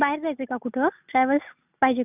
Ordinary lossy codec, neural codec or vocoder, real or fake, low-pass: none; none; real; 3.6 kHz